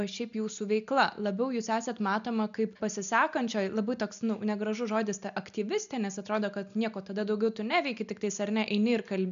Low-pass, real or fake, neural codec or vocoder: 7.2 kHz; real; none